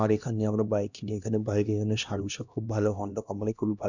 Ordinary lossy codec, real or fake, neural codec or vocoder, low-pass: none; fake; codec, 16 kHz, 1 kbps, X-Codec, HuBERT features, trained on LibriSpeech; 7.2 kHz